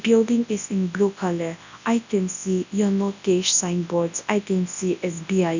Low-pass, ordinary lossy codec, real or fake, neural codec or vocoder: 7.2 kHz; none; fake; codec, 24 kHz, 0.9 kbps, WavTokenizer, large speech release